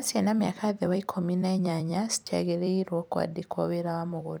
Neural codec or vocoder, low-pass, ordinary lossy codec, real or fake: vocoder, 44.1 kHz, 128 mel bands every 256 samples, BigVGAN v2; none; none; fake